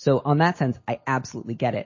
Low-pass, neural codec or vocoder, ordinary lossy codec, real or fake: 7.2 kHz; none; MP3, 32 kbps; real